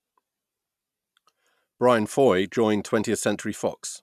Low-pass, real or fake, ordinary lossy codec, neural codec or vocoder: 14.4 kHz; real; none; none